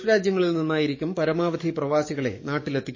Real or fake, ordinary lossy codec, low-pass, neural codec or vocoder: fake; MP3, 32 kbps; 7.2 kHz; codec, 44.1 kHz, 7.8 kbps, DAC